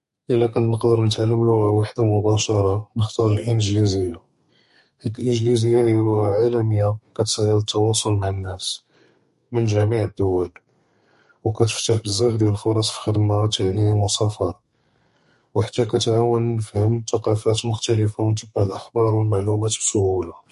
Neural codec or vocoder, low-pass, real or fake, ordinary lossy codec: codec, 32 kHz, 1.9 kbps, SNAC; 14.4 kHz; fake; MP3, 48 kbps